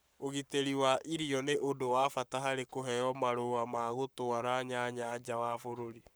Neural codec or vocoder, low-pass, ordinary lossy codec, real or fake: codec, 44.1 kHz, 7.8 kbps, Pupu-Codec; none; none; fake